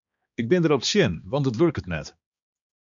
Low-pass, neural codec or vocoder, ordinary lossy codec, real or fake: 7.2 kHz; codec, 16 kHz, 2 kbps, X-Codec, HuBERT features, trained on balanced general audio; MP3, 96 kbps; fake